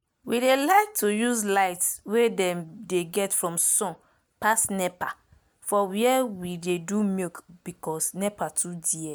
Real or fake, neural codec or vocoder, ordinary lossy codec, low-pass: real; none; none; none